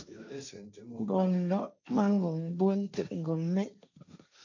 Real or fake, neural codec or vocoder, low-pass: fake; codec, 16 kHz, 1.1 kbps, Voila-Tokenizer; 7.2 kHz